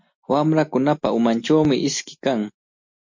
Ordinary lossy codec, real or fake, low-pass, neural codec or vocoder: MP3, 48 kbps; real; 7.2 kHz; none